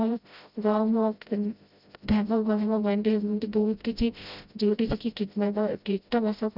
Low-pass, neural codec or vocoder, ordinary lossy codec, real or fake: 5.4 kHz; codec, 16 kHz, 0.5 kbps, FreqCodec, smaller model; none; fake